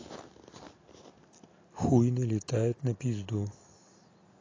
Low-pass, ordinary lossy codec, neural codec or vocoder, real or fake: 7.2 kHz; AAC, 32 kbps; none; real